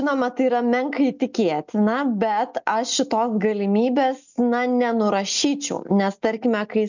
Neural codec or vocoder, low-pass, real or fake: none; 7.2 kHz; real